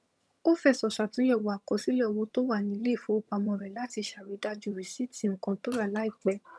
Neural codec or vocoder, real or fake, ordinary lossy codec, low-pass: vocoder, 22.05 kHz, 80 mel bands, HiFi-GAN; fake; none; none